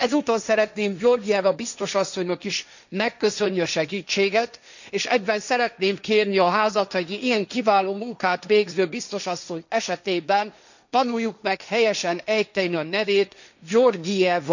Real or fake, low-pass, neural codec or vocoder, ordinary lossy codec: fake; 7.2 kHz; codec, 16 kHz, 1.1 kbps, Voila-Tokenizer; none